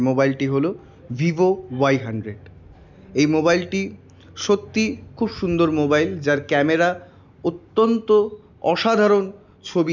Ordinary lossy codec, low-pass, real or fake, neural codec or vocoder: none; 7.2 kHz; real; none